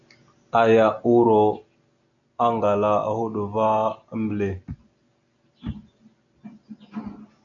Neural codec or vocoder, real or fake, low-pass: none; real; 7.2 kHz